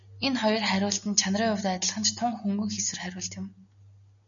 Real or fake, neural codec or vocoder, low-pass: real; none; 7.2 kHz